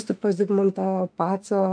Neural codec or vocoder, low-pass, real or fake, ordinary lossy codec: autoencoder, 48 kHz, 32 numbers a frame, DAC-VAE, trained on Japanese speech; 9.9 kHz; fake; MP3, 64 kbps